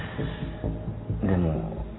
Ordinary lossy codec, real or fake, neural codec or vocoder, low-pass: AAC, 16 kbps; real; none; 7.2 kHz